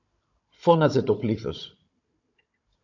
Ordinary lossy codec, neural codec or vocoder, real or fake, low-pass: AAC, 48 kbps; codec, 16 kHz, 16 kbps, FunCodec, trained on Chinese and English, 50 frames a second; fake; 7.2 kHz